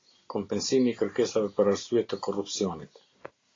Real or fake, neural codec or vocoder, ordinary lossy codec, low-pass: real; none; AAC, 32 kbps; 7.2 kHz